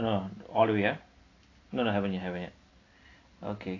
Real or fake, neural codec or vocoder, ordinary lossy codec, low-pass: real; none; AAC, 32 kbps; 7.2 kHz